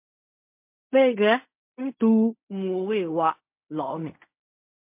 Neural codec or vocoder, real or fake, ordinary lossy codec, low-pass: codec, 16 kHz in and 24 kHz out, 0.4 kbps, LongCat-Audio-Codec, fine tuned four codebook decoder; fake; MP3, 32 kbps; 3.6 kHz